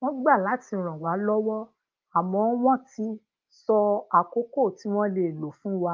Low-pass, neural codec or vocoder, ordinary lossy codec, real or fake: 7.2 kHz; none; Opus, 24 kbps; real